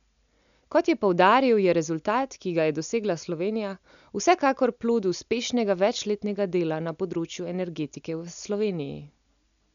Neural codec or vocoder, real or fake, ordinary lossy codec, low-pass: none; real; none; 7.2 kHz